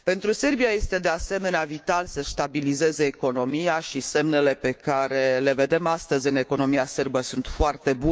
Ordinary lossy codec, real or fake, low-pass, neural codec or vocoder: none; fake; none; codec, 16 kHz, 6 kbps, DAC